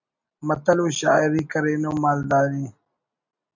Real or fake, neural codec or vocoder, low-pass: real; none; 7.2 kHz